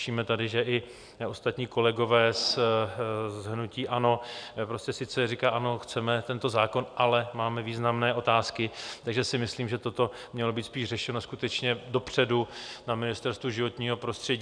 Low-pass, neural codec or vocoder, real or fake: 9.9 kHz; none; real